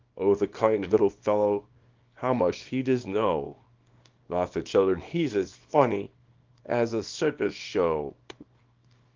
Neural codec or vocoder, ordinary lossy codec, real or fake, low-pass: codec, 24 kHz, 0.9 kbps, WavTokenizer, small release; Opus, 32 kbps; fake; 7.2 kHz